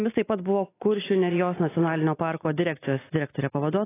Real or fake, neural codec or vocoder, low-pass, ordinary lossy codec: real; none; 3.6 kHz; AAC, 16 kbps